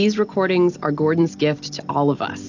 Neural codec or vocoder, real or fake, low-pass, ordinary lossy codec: none; real; 7.2 kHz; AAC, 48 kbps